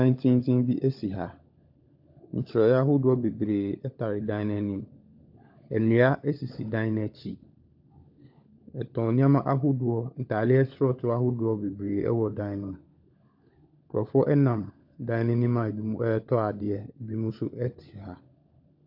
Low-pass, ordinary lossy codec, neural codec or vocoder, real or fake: 5.4 kHz; AAC, 48 kbps; codec, 16 kHz, 16 kbps, FunCodec, trained on LibriTTS, 50 frames a second; fake